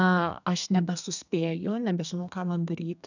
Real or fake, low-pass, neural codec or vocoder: fake; 7.2 kHz; codec, 32 kHz, 1.9 kbps, SNAC